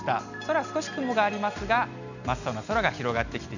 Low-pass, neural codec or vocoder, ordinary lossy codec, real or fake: 7.2 kHz; none; none; real